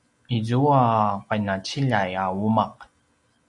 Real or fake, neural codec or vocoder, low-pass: real; none; 10.8 kHz